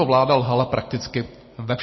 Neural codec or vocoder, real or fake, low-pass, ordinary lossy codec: none; real; 7.2 kHz; MP3, 24 kbps